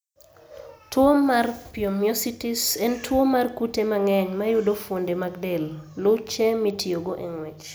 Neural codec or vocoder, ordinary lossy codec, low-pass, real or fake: none; none; none; real